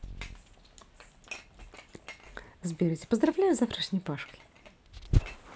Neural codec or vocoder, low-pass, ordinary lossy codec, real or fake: none; none; none; real